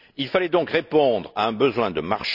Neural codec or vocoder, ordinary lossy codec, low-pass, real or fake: none; none; 5.4 kHz; real